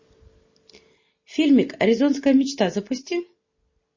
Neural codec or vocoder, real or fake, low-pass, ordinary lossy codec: none; real; 7.2 kHz; MP3, 32 kbps